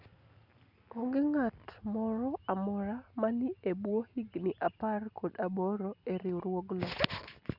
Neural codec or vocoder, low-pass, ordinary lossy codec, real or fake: none; 5.4 kHz; Opus, 64 kbps; real